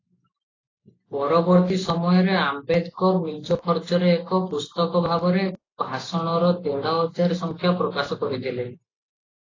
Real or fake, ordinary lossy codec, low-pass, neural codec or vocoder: real; AAC, 32 kbps; 7.2 kHz; none